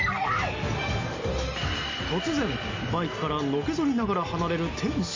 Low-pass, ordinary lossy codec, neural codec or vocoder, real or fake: 7.2 kHz; MP3, 48 kbps; none; real